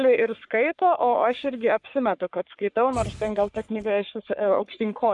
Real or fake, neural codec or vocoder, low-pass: fake; codec, 44.1 kHz, 3.4 kbps, Pupu-Codec; 10.8 kHz